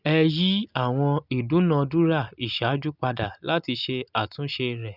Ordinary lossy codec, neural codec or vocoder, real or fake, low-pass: none; none; real; 5.4 kHz